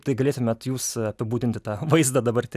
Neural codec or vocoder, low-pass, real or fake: none; 14.4 kHz; real